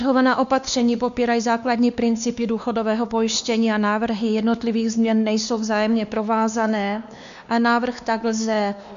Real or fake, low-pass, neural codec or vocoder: fake; 7.2 kHz; codec, 16 kHz, 2 kbps, X-Codec, WavLM features, trained on Multilingual LibriSpeech